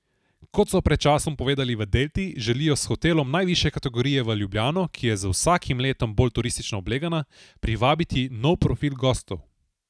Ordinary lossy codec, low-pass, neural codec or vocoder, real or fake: none; none; none; real